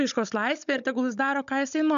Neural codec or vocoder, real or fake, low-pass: codec, 16 kHz, 16 kbps, FunCodec, trained on Chinese and English, 50 frames a second; fake; 7.2 kHz